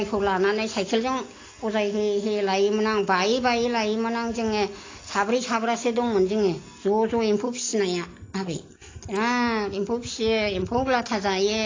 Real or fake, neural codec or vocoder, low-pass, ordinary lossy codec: real; none; 7.2 kHz; AAC, 32 kbps